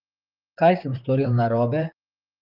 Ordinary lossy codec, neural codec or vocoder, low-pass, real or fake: Opus, 24 kbps; vocoder, 44.1 kHz, 128 mel bands, Pupu-Vocoder; 5.4 kHz; fake